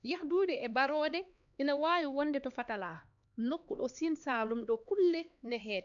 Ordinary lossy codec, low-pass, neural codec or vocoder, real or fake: none; 7.2 kHz; codec, 16 kHz, 2 kbps, X-Codec, HuBERT features, trained on LibriSpeech; fake